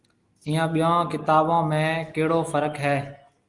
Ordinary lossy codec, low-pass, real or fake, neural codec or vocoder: Opus, 32 kbps; 10.8 kHz; real; none